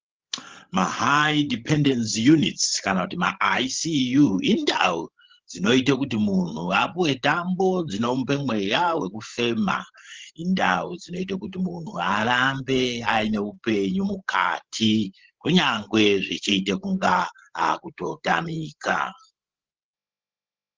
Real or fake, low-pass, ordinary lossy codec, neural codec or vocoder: fake; 7.2 kHz; Opus, 16 kbps; codec, 16 kHz, 16 kbps, FreqCodec, larger model